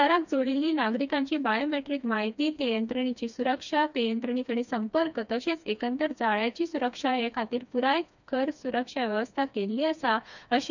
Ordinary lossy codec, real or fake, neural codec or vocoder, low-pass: none; fake; codec, 16 kHz, 2 kbps, FreqCodec, smaller model; 7.2 kHz